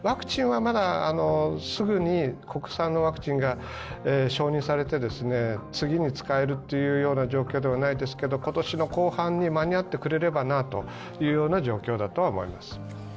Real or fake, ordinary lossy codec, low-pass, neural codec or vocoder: real; none; none; none